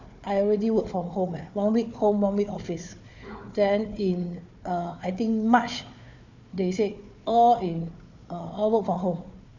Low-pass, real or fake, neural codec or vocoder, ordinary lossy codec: 7.2 kHz; fake; codec, 16 kHz, 4 kbps, FunCodec, trained on Chinese and English, 50 frames a second; none